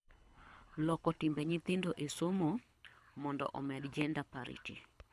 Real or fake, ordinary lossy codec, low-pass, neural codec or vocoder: fake; none; none; codec, 24 kHz, 6 kbps, HILCodec